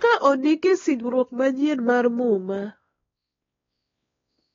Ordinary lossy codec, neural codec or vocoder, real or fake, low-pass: AAC, 24 kbps; codec, 16 kHz, 2 kbps, X-Codec, WavLM features, trained on Multilingual LibriSpeech; fake; 7.2 kHz